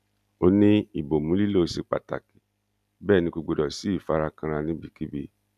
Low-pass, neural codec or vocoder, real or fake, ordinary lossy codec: 14.4 kHz; none; real; none